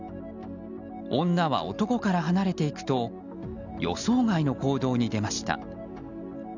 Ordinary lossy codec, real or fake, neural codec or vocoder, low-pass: none; real; none; 7.2 kHz